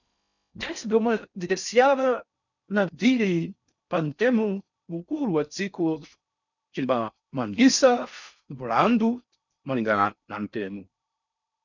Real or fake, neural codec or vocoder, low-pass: fake; codec, 16 kHz in and 24 kHz out, 0.6 kbps, FocalCodec, streaming, 4096 codes; 7.2 kHz